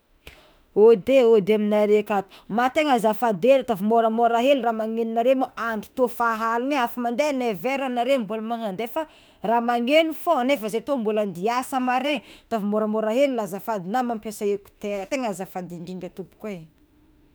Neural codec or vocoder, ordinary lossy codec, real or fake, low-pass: autoencoder, 48 kHz, 32 numbers a frame, DAC-VAE, trained on Japanese speech; none; fake; none